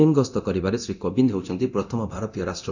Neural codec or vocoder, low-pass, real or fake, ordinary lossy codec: codec, 24 kHz, 0.9 kbps, DualCodec; 7.2 kHz; fake; none